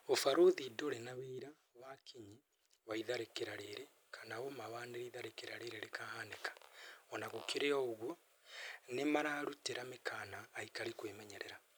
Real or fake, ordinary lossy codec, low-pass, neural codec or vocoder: real; none; none; none